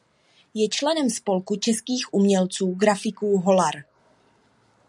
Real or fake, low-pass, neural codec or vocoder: real; 10.8 kHz; none